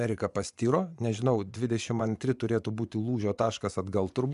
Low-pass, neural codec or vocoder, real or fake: 10.8 kHz; vocoder, 24 kHz, 100 mel bands, Vocos; fake